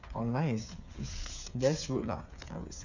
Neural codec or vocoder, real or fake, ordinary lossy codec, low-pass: codec, 16 kHz, 8 kbps, FreqCodec, smaller model; fake; none; 7.2 kHz